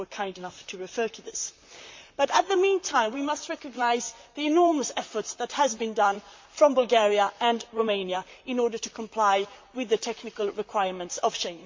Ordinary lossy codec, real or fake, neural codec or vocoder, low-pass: MP3, 48 kbps; fake; vocoder, 44.1 kHz, 128 mel bands, Pupu-Vocoder; 7.2 kHz